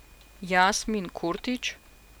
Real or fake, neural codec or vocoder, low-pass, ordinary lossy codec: real; none; none; none